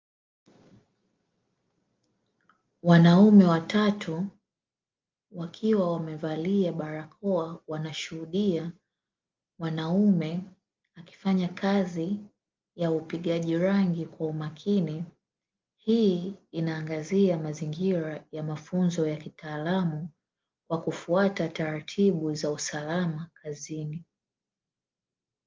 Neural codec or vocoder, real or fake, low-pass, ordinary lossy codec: none; real; 7.2 kHz; Opus, 32 kbps